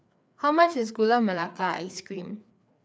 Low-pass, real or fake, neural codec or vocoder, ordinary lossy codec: none; fake; codec, 16 kHz, 4 kbps, FreqCodec, larger model; none